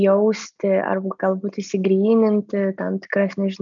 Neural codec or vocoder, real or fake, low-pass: none; real; 7.2 kHz